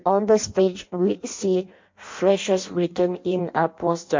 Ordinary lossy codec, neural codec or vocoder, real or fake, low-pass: MP3, 48 kbps; codec, 16 kHz in and 24 kHz out, 0.6 kbps, FireRedTTS-2 codec; fake; 7.2 kHz